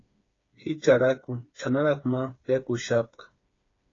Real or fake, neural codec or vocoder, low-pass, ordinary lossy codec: fake; codec, 16 kHz, 4 kbps, FreqCodec, smaller model; 7.2 kHz; AAC, 32 kbps